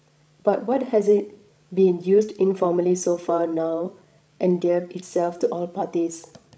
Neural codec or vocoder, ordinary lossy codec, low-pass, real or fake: codec, 16 kHz, 16 kbps, FreqCodec, larger model; none; none; fake